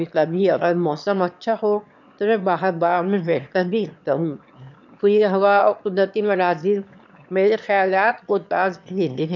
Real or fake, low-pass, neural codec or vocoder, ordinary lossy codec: fake; 7.2 kHz; autoencoder, 22.05 kHz, a latent of 192 numbers a frame, VITS, trained on one speaker; none